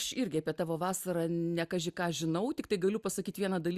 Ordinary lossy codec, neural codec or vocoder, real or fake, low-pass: Opus, 64 kbps; none; real; 14.4 kHz